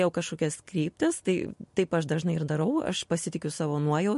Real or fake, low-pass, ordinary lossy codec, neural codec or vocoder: real; 14.4 kHz; MP3, 48 kbps; none